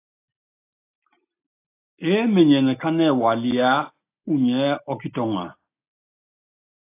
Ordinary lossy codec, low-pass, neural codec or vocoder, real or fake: AAC, 24 kbps; 3.6 kHz; none; real